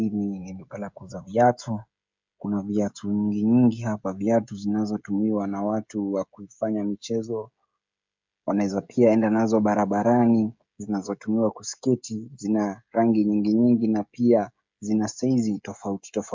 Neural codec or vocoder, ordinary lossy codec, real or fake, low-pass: codec, 16 kHz, 16 kbps, FreqCodec, smaller model; MP3, 64 kbps; fake; 7.2 kHz